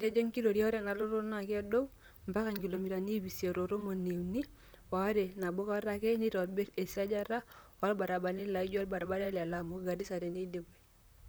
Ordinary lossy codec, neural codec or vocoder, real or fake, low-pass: none; vocoder, 44.1 kHz, 128 mel bands, Pupu-Vocoder; fake; none